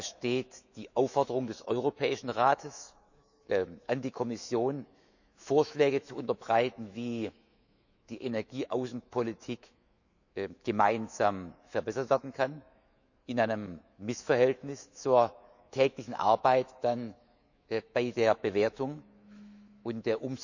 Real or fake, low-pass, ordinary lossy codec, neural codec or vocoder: fake; 7.2 kHz; none; autoencoder, 48 kHz, 128 numbers a frame, DAC-VAE, trained on Japanese speech